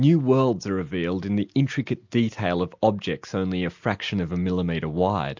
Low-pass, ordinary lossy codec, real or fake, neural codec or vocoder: 7.2 kHz; MP3, 64 kbps; real; none